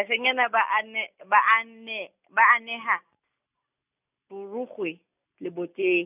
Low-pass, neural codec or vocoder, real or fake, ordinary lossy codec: 3.6 kHz; none; real; none